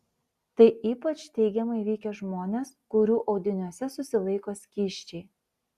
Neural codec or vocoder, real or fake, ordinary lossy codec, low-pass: none; real; Opus, 64 kbps; 14.4 kHz